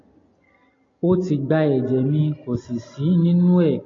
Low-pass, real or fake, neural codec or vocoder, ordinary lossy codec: 7.2 kHz; real; none; AAC, 32 kbps